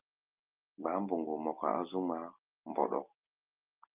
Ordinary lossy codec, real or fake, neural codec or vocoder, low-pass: Opus, 24 kbps; real; none; 3.6 kHz